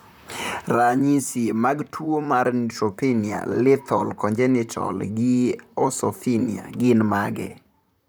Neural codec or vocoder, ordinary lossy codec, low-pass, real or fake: vocoder, 44.1 kHz, 128 mel bands, Pupu-Vocoder; none; none; fake